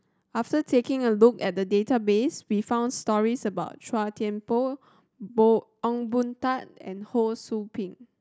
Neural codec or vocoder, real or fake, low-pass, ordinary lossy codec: none; real; none; none